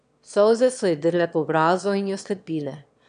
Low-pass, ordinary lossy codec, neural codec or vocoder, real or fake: 9.9 kHz; AAC, 96 kbps; autoencoder, 22.05 kHz, a latent of 192 numbers a frame, VITS, trained on one speaker; fake